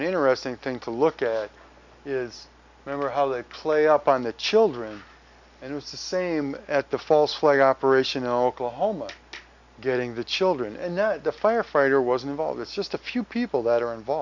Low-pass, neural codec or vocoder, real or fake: 7.2 kHz; none; real